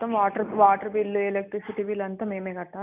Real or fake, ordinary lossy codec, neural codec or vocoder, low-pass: real; none; none; 3.6 kHz